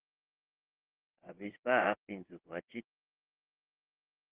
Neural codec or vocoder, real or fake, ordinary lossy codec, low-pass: vocoder, 22.05 kHz, 80 mel bands, WaveNeXt; fake; Opus, 16 kbps; 3.6 kHz